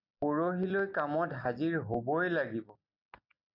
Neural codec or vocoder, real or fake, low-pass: none; real; 5.4 kHz